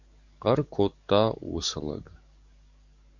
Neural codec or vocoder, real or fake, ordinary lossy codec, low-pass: codec, 44.1 kHz, 7.8 kbps, DAC; fake; Opus, 64 kbps; 7.2 kHz